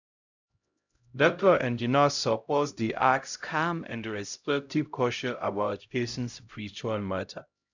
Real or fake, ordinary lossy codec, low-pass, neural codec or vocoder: fake; none; 7.2 kHz; codec, 16 kHz, 0.5 kbps, X-Codec, HuBERT features, trained on LibriSpeech